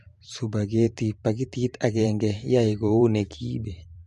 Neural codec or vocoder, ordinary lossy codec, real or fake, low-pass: vocoder, 44.1 kHz, 128 mel bands every 512 samples, BigVGAN v2; MP3, 48 kbps; fake; 14.4 kHz